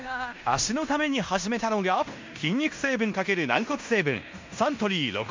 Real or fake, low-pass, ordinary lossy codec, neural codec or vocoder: fake; 7.2 kHz; MP3, 64 kbps; codec, 16 kHz in and 24 kHz out, 0.9 kbps, LongCat-Audio-Codec, fine tuned four codebook decoder